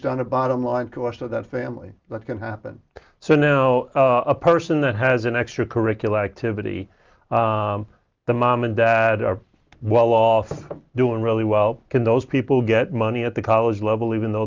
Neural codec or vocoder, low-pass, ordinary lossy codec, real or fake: none; 7.2 kHz; Opus, 32 kbps; real